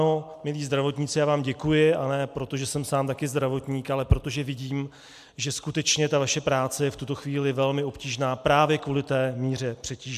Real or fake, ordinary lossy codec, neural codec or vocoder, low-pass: real; MP3, 96 kbps; none; 14.4 kHz